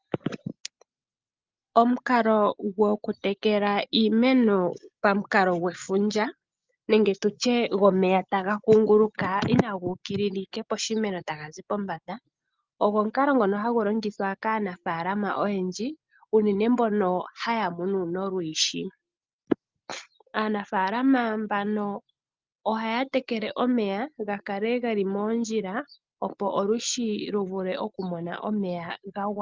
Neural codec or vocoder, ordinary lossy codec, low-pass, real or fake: none; Opus, 32 kbps; 7.2 kHz; real